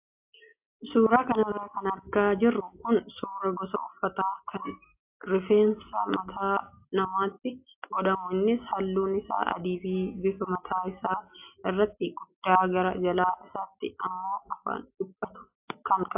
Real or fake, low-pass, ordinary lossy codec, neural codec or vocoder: real; 3.6 kHz; AAC, 32 kbps; none